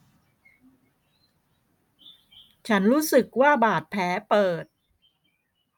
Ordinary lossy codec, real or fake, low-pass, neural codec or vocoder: none; real; 19.8 kHz; none